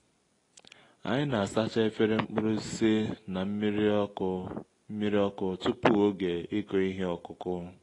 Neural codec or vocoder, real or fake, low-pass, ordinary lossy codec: none; real; 10.8 kHz; AAC, 32 kbps